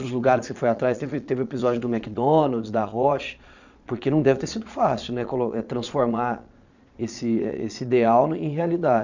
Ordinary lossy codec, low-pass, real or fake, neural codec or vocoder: none; 7.2 kHz; fake; vocoder, 22.05 kHz, 80 mel bands, Vocos